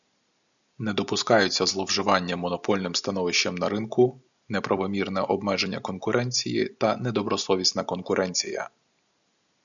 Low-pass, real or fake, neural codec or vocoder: 7.2 kHz; real; none